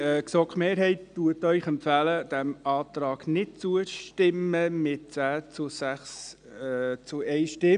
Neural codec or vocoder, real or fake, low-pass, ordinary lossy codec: none; real; 9.9 kHz; none